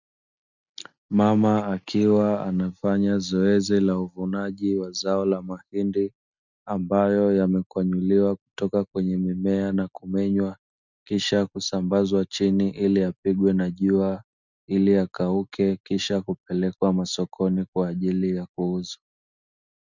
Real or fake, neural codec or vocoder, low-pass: real; none; 7.2 kHz